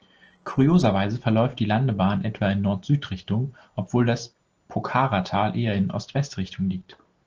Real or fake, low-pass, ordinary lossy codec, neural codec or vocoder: real; 7.2 kHz; Opus, 16 kbps; none